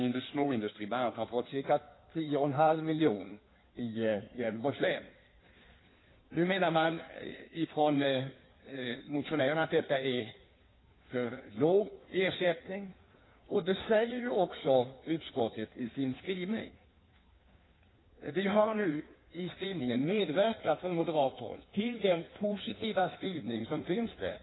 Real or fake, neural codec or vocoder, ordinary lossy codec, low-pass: fake; codec, 16 kHz in and 24 kHz out, 1.1 kbps, FireRedTTS-2 codec; AAC, 16 kbps; 7.2 kHz